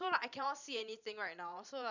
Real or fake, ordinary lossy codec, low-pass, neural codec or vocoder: fake; none; 7.2 kHz; vocoder, 44.1 kHz, 128 mel bands every 512 samples, BigVGAN v2